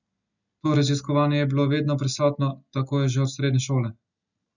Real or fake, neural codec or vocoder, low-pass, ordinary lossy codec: real; none; 7.2 kHz; none